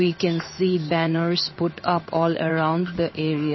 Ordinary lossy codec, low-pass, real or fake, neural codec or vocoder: MP3, 24 kbps; 7.2 kHz; fake; codec, 16 kHz in and 24 kHz out, 1 kbps, XY-Tokenizer